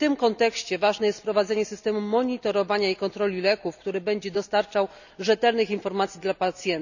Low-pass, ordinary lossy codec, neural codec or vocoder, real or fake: 7.2 kHz; none; none; real